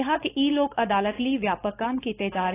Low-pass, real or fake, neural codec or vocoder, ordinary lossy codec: 3.6 kHz; fake; codec, 16 kHz, 4.8 kbps, FACodec; AAC, 16 kbps